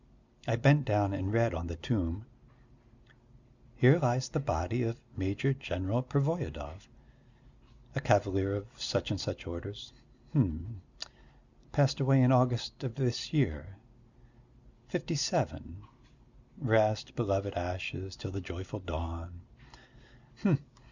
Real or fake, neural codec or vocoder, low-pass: real; none; 7.2 kHz